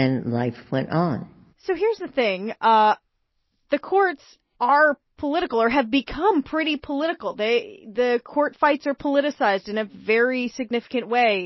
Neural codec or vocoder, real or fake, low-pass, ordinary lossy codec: none; real; 7.2 kHz; MP3, 24 kbps